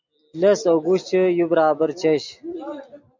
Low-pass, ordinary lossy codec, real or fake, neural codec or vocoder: 7.2 kHz; MP3, 64 kbps; real; none